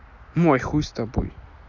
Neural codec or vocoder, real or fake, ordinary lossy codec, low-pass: none; real; none; 7.2 kHz